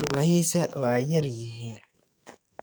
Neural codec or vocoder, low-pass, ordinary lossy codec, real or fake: codec, 44.1 kHz, 2.6 kbps, SNAC; none; none; fake